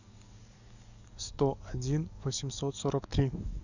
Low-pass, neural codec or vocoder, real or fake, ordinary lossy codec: 7.2 kHz; codec, 44.1 kHz, 7.8 kbps, DAC; fake; none